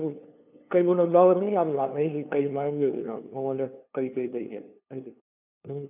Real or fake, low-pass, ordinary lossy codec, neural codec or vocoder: fake; 3.6 kHz; none; codec, 16 kHz, 2 kbps, FunCodec, trained on LibriTTS, 25 frames a second